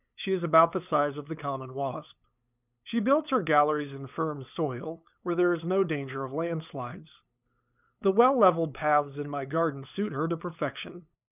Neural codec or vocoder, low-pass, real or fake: codec, 16 kHz, 8 kbps, FunCodec, trained on LibriTTS, 25 frames a second; 3.6 kHz; fake